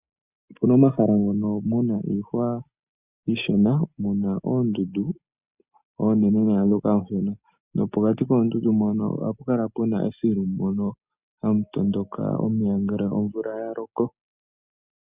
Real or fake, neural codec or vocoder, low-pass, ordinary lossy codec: real; none; 3.6 kHz; Opus, 64 kbps